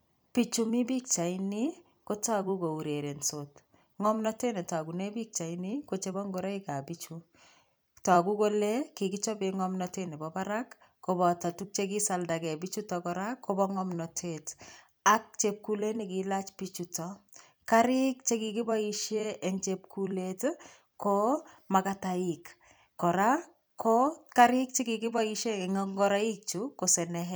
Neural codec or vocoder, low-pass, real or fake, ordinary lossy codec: vocoder, 44.1 kHz, 128 mel bands every 256 samples, BigVGAN v2; none; fake; none